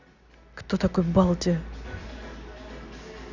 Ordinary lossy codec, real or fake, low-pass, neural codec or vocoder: none; real; 7.2 kHz; none